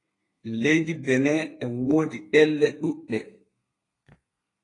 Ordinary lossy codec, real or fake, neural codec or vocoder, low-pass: AAC, 32 kbps; fake; codec, 32 kHz, 1.9 kbps, SNAC; 10.8 kHz